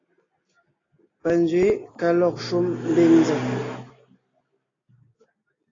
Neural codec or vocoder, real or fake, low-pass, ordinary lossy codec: none; real; 7.2 kHz; AAC, 32 kbps